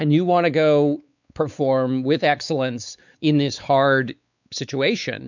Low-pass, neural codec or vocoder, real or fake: 7.2 kHz; codec, 16 kHz, 4 kbps, X-Codec, WavLM features, trained on Multilingual LibriSpeech; fake